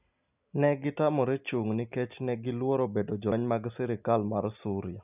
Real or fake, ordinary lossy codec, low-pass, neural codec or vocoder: real; none; 3.6 kHz; none